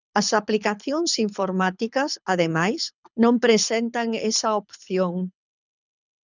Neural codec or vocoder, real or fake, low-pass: codec, 24 kHz, 6 kbps, HILCodec; fake; 7.2 kHz